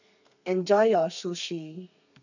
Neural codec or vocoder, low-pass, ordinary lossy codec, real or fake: codec, 32 kHz, 1.9 kbps, SNAC; 7.2 kHz; none; fake